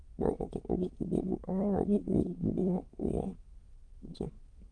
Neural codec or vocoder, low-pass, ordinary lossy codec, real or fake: autoencoder, 22.05 kHz, a latent of 192 numbers a frame, VITS, trained on many speakers; 9.9 kHz; Opus, 24 kbps; fake